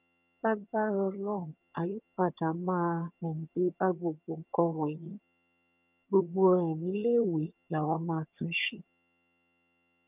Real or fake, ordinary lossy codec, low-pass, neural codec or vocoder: fake; none; 3.6 kHz; vocoder, 22.05 kHz, 80 mel bands, HiFi-GAN